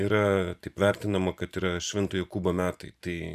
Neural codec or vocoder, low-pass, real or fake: vocoder, 44.1 kHz, 128 mel bands every 512 samples, BigVGAN v2; 14.4 kHz; fake